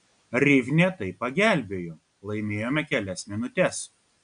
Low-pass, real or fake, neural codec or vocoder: 9.9 kHz; real; none